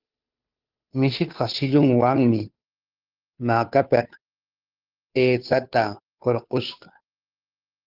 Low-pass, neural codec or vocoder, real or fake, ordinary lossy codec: 5.4 kHz; codec, 16 kHz, 2 kbps, FunCodec, trained on Chinese and English, 25 frames a second; fake; Opus, 32 kbps